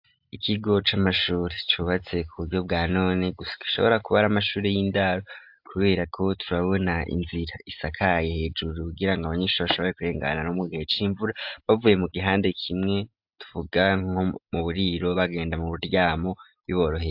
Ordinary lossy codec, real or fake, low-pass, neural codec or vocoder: AAC, 48 kbps; real; 5.4 kHz; none